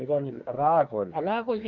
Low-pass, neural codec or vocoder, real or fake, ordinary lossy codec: 7.2 kHz; codec, 24 kHz, 1 kbps, SNAC; fake; none